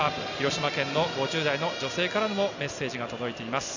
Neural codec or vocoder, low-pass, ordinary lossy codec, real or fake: none; 7.2 kHz; none; real